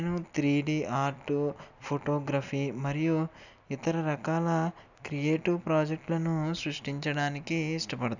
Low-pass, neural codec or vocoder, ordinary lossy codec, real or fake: 7.2 kHz; none; none; real